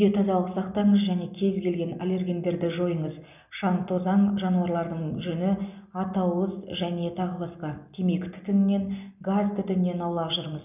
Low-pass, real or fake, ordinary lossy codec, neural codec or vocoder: 3.6 kHz; real; none; none